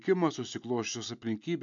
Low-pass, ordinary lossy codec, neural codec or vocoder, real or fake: 7.2 kHz; AAC, 64 kbps; none; real